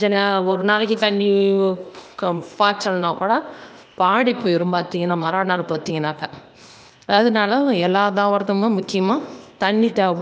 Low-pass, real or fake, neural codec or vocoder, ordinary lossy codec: none; fake; codec, 16 kHz, 0.8 kbps, ZipCodec; none